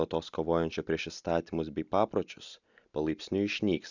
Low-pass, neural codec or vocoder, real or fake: 7.2 kHz; none; real